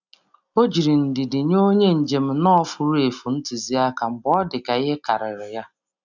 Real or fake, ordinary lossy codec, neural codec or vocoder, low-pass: real; none; none; 7.2 kHz